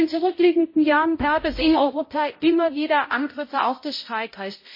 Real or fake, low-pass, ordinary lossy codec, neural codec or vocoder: fake; 5.4 kHz; MP3, 24 kbps; codec, 16 kHz, 0.5 kbps, X-Codec, HuBERT features, trained on balanced general audio